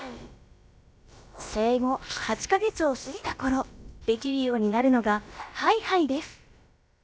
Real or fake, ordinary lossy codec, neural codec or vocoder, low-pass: fake; none; codec, 16 kHz, about 1 kbps, DyCAST, with the encoder's durations; none